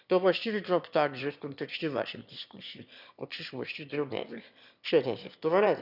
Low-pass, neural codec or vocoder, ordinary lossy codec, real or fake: 5.4 kHz; autoencoder, 22.05 kHz, a latent of 192 numbers a frame, VITS, trained on one speaker; none; fake